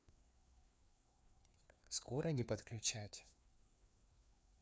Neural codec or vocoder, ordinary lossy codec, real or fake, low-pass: codec, 16 kHz, 2 kbps, FreqCodec, larger model; none; fake; none